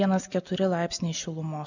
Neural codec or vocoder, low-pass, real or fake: none; 7.2 kHz; real